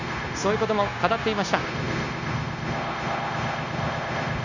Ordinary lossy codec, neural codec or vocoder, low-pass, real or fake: none; codec, 16 kHz, 0.9 kbps, LongCat-Audio-Codec; 7.2 kHz; fake